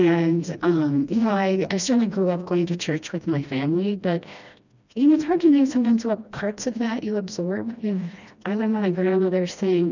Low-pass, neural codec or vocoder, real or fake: 7.2 kHz; codec, 16 kHz, 1 kbps, FreqCodec, smaller model; fake